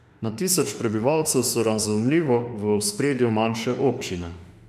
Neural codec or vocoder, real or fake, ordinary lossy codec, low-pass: autoencoder, 48 kHz, 32 numbers a frame, DAC-VAE, trained on Japanese speech; fake; MP3, 96 kbps; 14.4 kHz